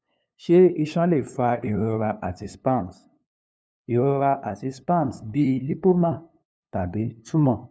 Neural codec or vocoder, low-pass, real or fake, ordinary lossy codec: codec, 16 kHz, 2 kbps, FunCodec, trained on LibriTTS, 25 frames a second; none; fake; none